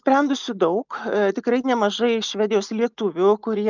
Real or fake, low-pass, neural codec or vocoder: real; 7.2 kHz; none